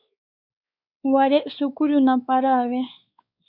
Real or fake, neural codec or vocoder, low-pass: fake; codec, 16 kHz, 4 kbps, X-Codec, WavLM features, trained on Multilingual LibriSpeech; 5.4 kHz